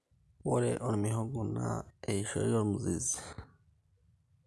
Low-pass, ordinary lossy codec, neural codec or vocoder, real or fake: none; none; none; real